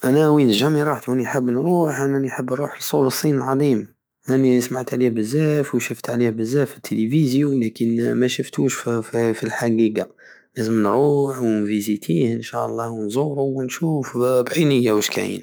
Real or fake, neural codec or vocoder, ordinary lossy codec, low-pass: fake; autoencoder, 48 kHz, 128 numbers a frame, DAC-VAE, trained on Japanese speech; none; none